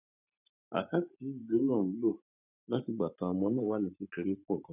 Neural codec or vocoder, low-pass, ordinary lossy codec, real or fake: vocoder, 44.1 kHz, 128 mel bands every 512 samples, BigVGAN v2; 3.6 kHz; none; fake